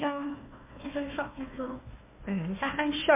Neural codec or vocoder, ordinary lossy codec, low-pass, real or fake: codec, 16 kHz, 1 kbps, FunCodec, trained on Chinese and English, 50 frames a second; MP3, 32 kbps; 3.6 kHz; fake